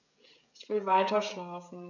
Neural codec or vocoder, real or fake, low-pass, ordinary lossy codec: codec, 16 kHz, 16 kbps, FreqCodec, smaller model; fake; 7.2 kHz; none